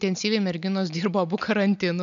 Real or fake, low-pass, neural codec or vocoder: real; 7.2 kHz; none